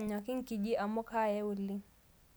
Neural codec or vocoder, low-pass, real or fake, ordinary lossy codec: none; none; real; none